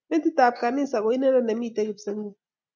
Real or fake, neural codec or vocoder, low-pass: real; none; 7.2 kHz